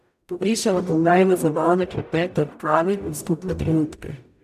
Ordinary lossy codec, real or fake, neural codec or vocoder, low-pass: none; fake; codec, 44.1 kHz, 0.9 kbps, DAC; 14.4 kHz